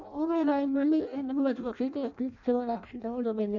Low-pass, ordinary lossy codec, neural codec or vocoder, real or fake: 7.2 kHz; none; codec, 16 kHz in and 24 kHz out, 0.6 kbps, FireRedTTS-2 codec; fake